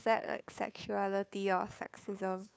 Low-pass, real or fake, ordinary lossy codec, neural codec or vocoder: none; fake; none; codec, 16 kHz, 2 kbps, FunCodec, trained on Chinese and English, 25 frames a second